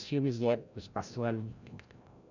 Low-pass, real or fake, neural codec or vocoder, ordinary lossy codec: 7.2 kHz; fake; codec, 16 kHz, 0.5 kbps, FreqCodec, larger model; none